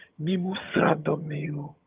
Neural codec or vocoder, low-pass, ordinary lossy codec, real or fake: vocoder, 22.05 kHz, 80 mel bands, HiFi-GAN; 3.6 kHz; Opus, 32 kbps; fake